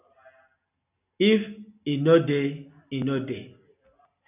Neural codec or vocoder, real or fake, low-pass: none; real; 3.6 kHz